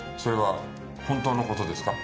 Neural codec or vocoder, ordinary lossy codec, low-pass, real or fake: none; none; none; real